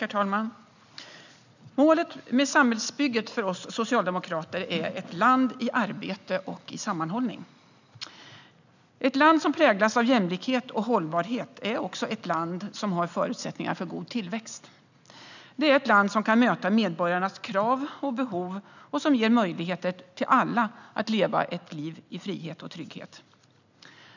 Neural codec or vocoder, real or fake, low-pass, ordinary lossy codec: none; real; 7.2 kHz; none